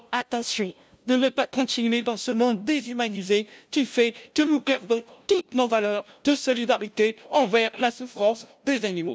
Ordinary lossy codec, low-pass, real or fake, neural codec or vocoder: none; none; fake; codec, 16 kHz, 0.5 kbps, FunCodec, trained on LibriTTS, 25 frames a second